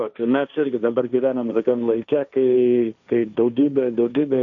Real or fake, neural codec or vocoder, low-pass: fake; codec, 16 kHz, 1.1 kbps, Voila-Tokenizer; 7.2 kHz